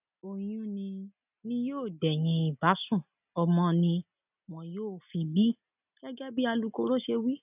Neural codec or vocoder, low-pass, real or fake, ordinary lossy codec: none; 3.6 kHz; real; none